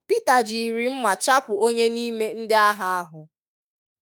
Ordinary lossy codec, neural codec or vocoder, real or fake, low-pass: none; autoencoder, 48 kHz, 32 numbers a frame, DAC-VAE, trained on Japanese speech; fake; none